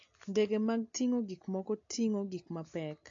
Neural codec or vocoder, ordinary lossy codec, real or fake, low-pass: none; AAC, 48 kbps; real; 7.2 kHz